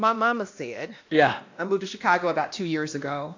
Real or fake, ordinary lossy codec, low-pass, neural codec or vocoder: fake; AAC, 48 kbps; 7.2 kHz; codec, 16 kHz, 1 kbps, X-Codec, WavLM features, trained on Multilingual LibriSpeech